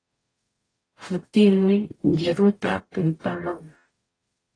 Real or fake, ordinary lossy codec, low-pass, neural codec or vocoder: fake; AAC, 32 kbps; 9.9 kHz; codec, 44.1 kHz, 0.9 kbps, DAC